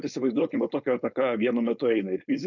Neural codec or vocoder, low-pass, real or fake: codec, 16 kHz, 4.8 kbps, FACodec; 7.2 kHz; fake